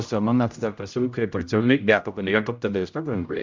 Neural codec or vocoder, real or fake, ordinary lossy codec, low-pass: codec, 16 kHz, 0.5 kbps, X-Codec, HuBERT features, trained on general audio; fake; MP3, 64 kbps; 7.2 kHz